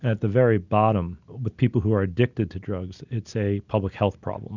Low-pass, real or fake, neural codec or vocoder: 7.2 kHz; real; none